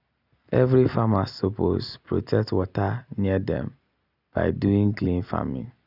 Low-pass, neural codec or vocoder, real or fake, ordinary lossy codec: 5.4 kHz; none; real; none